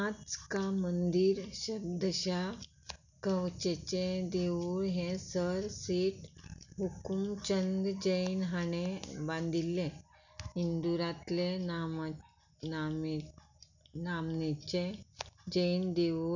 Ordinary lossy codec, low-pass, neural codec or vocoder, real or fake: none; 7.2 kHz; none; real